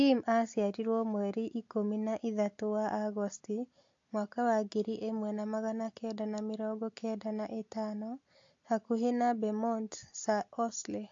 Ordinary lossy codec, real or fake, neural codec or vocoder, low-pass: AAC, 48 kbps; real; none; 7.2 kHz